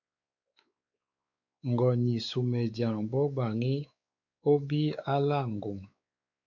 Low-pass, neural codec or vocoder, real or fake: 7.2 kHz; codec, 16 kHz, 4 kbps, X-Codec, WavLM features, trained on Multilingual LibriSpeech; fake